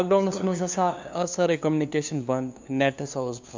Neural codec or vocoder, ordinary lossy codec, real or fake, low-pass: codec, 16 kHz, 2 kbps, FunCodec, trained on LibriTTS, 25 frames a second; MP3, 64 kbps; fake; 7.2 kHz